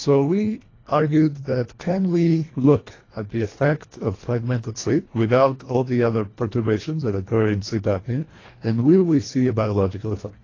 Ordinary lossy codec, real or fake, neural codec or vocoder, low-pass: AAC, 32 kbps; fake; codec, 24 kHz, 1.5 kbps, HILCodec; 7.2 kHz